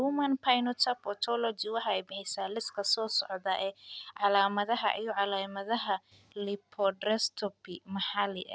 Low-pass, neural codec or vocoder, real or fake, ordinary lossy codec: none; none; real; none